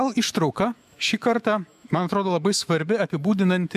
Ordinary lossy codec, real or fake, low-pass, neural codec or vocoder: MP3, 96 kbps; fake; 14.4 kHz; autoencoder, 48 kHz, 128 numbers a frame, DAC-VAE, trained on Japanese speech